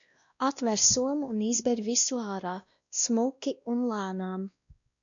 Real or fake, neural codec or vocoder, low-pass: fake; codec, 16 kHz, 1 kbps, X-Codec, WavLM features, trained on Multilingual LibriSpeech; 7.2 kHz